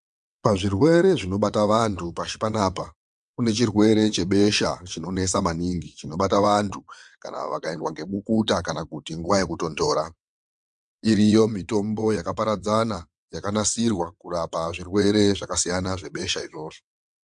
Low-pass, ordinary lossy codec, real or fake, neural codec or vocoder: 9.9 kHz; MP3, 64 kbps; fake; vocoder, 22.05 kHz, 80 mel bands, WaveNeXt